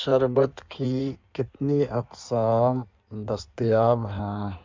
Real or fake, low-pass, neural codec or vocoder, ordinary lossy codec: fake; 7.2 kHz; codec, 16 kHz in and 24 kHz out, 1.1 kbps, FireRedTTS-2 codec; MP3, 64 kbps